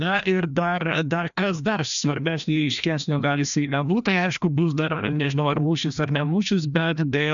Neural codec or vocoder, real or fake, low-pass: codec, 16 kHz, 1 kbps, FreqCodec, larger model; fake; 7.2 kHz